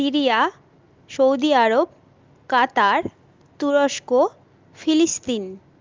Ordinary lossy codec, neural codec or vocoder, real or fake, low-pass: Opus, 32 kbps; none; real; 7.2 kHz